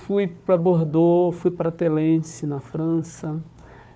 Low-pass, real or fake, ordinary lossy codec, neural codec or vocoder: none; fake; none; codec, 16 kHz, 4 kbps, FunCodec, trained on Chinese and English, 50 frames a second